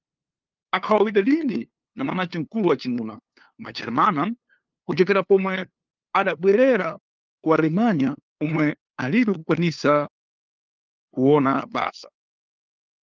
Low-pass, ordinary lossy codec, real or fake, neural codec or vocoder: 7.2 kHz; Opus, 32 kbps; fake; codec, 16 kHz, 2 kbps, FunCodec, trained on LibriTTS, 25 frames a second